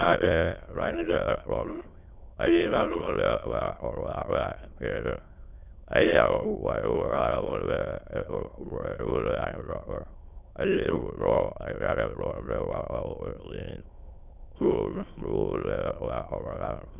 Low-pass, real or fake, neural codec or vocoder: 3.6 kHz; fake; autoencoder, 22.05 kHz, a latent of 192 numbers a frame, VITS, trained on many speakers